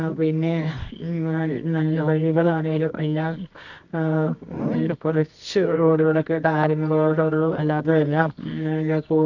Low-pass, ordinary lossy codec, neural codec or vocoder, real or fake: 7.2 kHz; none; codec, 24 kHz, 0.9 kbps, WavTokenizer, medium music audio release; fake